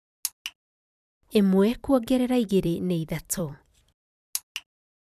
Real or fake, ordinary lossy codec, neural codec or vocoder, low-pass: fake; none; vocoder, 44.1 kHz, 128 mel bands every 256 samples, BigVGAN v2; 14.4 kHz